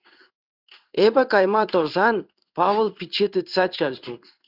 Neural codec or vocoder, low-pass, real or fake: codec, 16 kHz in and 24 kHz out, 1 kbps, XY-Tokenizer; 5.4 kHz; fake